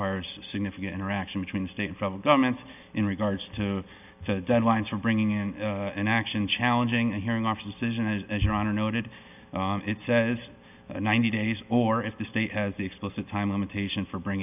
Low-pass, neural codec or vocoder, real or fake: 3.6 kHz; none; real